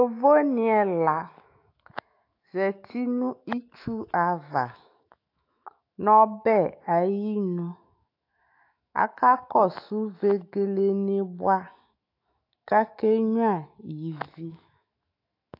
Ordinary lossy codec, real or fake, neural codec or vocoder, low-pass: AAC, 32 kbps; fake; autoencoder, 48 kHz, 128 numbers a frame, DAC-VAE, trained on Japanese speech; 5.4 kHz